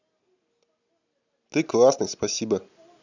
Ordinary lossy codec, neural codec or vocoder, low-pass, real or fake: none; none; 7.2 kHz; real